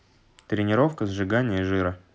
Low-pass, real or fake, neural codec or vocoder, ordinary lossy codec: none; real; none; none